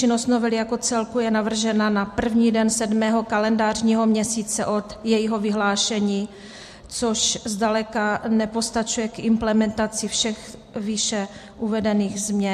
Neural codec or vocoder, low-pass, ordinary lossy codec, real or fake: vocoder, 44.1 kHz, 128 mel bands every 256 samples, BigVGAN v2; 14.4 kHz; MP3, 64 kbps; fake